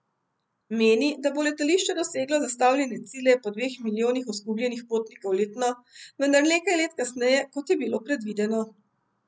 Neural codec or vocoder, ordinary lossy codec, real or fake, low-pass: none; none; real; none